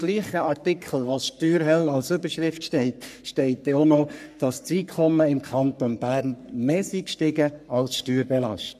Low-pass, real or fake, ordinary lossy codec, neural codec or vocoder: 14.4 kHz; fake; none; codec, 44.1 kHz, 3.4 kbps, Pupu-Codec